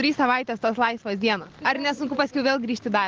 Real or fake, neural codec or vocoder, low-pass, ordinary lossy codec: real; none; 7.2 kHz; Opus, 16 kbps